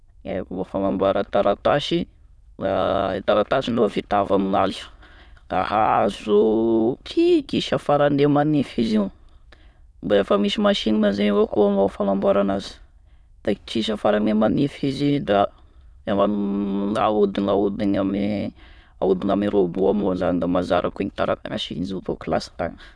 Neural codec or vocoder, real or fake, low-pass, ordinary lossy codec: autoencoder, 22.05 kHz, a latent of 192 numbers a frame, VITS, trained on many speakers; fake; none; none